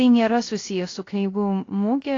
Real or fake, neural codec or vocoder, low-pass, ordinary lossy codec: fake; codec, 16 kHz, 0.3 kbps, FocalCodec; 7.2 kHz; AAC, 32 kbps